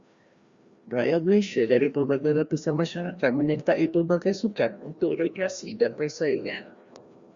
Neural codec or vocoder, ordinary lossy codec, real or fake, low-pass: codec, 16 kHz, 1 kbps, FreqCodec, larger model; Opus, 64 kbps; fake; 7.2 kHz